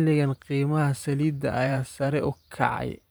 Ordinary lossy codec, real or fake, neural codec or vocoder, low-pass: none; fake; vocoder, 44.1 kHz, 128 mel bands every 512 samples, BigVGAN v2; none